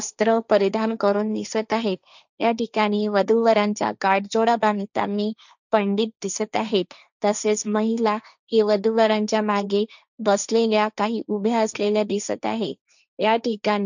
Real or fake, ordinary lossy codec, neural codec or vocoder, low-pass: fake; none; codec, 16 kHz, 1.1 kbps, Voila-Tokenizer; 7.2 kHz